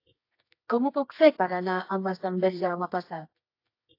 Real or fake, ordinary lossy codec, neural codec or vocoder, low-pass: fake; AAC, 32 kbps; codec, 24 kHz, 0.9 kbps, WavTokenizer, medium music audio release; 5.4 kHz